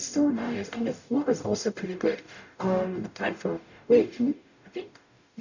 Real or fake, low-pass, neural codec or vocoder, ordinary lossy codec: fake; 7.2 kHz; codec, 44.1 kHz, 0.9 kbps, DAC; none